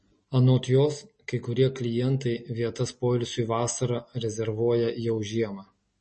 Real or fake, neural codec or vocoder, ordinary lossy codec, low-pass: real; none; MP3, 32 kbps; 10.8 kHz